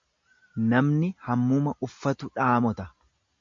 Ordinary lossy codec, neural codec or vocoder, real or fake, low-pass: MP3, 48 kbps; none; real; 7.2 kHz